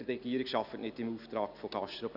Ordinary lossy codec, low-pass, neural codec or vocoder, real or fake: none; 5.4 kHz; none; real